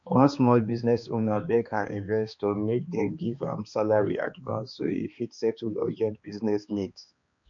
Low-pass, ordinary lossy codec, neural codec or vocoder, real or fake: 7.2 kHz; MP3, 48 kbps; codec, 16 kHz, 2 kbps, X-Codec, HuBERT features, trained on balanced general audio; fake